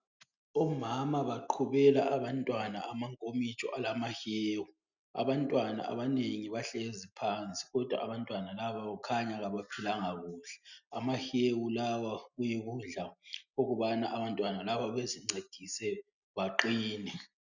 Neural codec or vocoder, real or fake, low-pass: none; real; 7.2 kHz